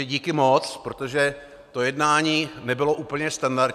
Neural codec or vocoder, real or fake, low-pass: none; real; 14.4 kHz